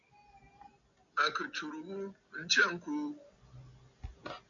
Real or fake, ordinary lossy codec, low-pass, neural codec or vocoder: real; MP3, 96 kbps; 7.2 kHz; none